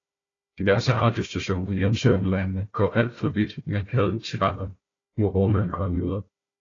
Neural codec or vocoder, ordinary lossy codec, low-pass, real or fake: codec, 16 kHz, 1 kbps, FunCodec, trained on Chinese and English, 50 frames a second; AAC, 32 kbps; 7.2 kHz; fake